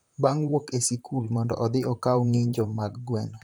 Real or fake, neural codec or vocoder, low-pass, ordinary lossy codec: fake; vocoder, 44.1 kHz, 128 mel bands, Pupu-Vocoder; none; none